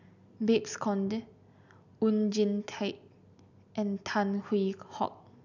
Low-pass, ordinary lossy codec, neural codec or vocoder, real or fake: 7.2 kHz; none; none; real